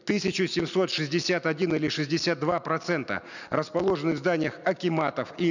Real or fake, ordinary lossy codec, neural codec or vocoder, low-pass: real; none; none; 7.2 kHz